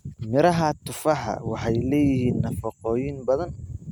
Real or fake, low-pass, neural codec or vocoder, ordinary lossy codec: real; 19.8 kHz; none; none